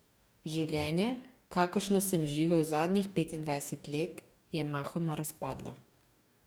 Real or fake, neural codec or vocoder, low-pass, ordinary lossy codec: fake; codec, 44.1 kHz, 2.6 kbps, DAC; none; none